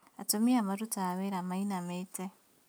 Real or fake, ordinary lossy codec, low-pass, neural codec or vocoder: real; none; none; none